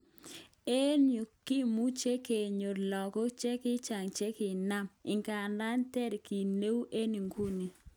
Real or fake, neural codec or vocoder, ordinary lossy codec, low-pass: real; none; none; none